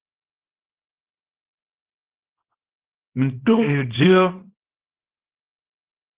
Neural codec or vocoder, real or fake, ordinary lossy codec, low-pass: codec, 24 kHz, 0.9 kbps, WavTokenizer, small release; fake; Opus, 16 kbps; 3.6 kHz